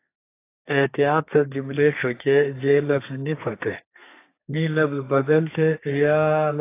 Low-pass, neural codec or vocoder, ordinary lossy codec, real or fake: 3.6 kHz; codec, 32 kHz, 1.9 kbps, SNAC; AAC, 24 kbps; fake